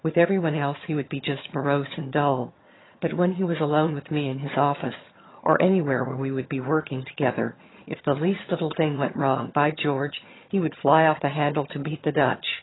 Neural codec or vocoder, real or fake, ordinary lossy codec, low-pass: vocoder, 22.05 kHz, 80 mel bands, HiFi-GAN; fake; AAC, 16 kbps; 7.2 kHz